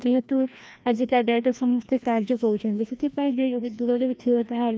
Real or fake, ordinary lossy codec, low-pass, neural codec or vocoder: fake; none; none; codec, 16 kHz, 1 kbps, FreqCodec, larger model